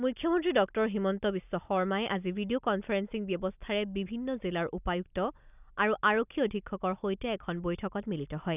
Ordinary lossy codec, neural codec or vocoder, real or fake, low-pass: none; none; real; 3.6 kHz